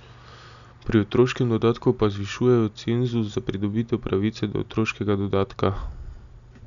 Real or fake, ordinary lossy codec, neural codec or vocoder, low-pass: real; none; none; 7.2 kHz